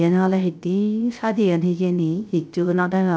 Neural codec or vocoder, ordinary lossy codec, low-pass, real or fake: codec, 16 kHz, 0.3 kbps, FocalCodec; none; none; fake